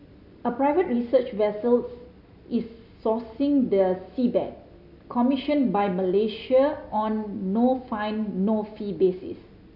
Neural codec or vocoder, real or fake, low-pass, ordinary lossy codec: none; real; 5.4 kHz; none